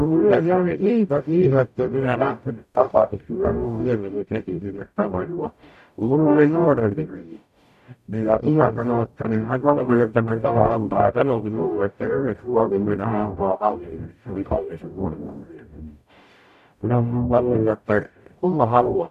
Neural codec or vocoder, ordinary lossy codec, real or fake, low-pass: codec, 44.1 kHz, 0.9 kbps, DAC; none; fake; 14.4 kHz